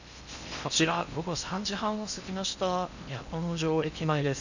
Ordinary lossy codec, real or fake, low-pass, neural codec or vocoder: none; fake; 7.2 kHz; codec, 16 kHz in and 24 kHz out, 0.8 kbps, FocalCodec, streaming, 65536 codes